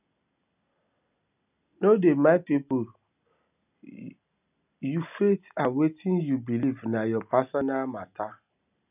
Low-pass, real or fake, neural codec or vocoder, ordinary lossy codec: 3.6 kHz; real; none; none